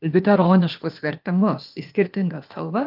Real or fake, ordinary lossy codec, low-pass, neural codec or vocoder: fake; Opus, 24 kbps; 5.4 kHz; codec, 16 kHz, 0.8 kbps, ZipCodec